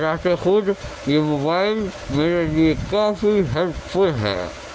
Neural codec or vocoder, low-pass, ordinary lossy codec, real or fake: none; none; none; real